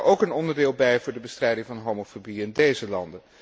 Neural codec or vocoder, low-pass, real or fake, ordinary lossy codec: none; none; real; none